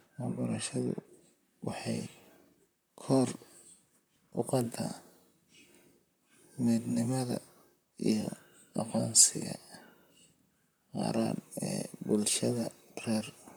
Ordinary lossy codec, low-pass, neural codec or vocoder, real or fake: none; none; vocoder, 44.1 kHz, 128 mel bands, Pupu-Vocoder; fake